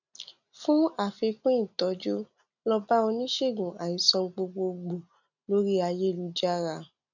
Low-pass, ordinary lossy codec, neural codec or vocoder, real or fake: 7.2 kHz; none; none; real